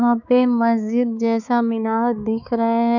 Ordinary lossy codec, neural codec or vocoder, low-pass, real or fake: none; codec, 16 kHz, 4 kbps, X-Codec, HuBERT features, trained on balanced general audio; 7.2 kHz; fake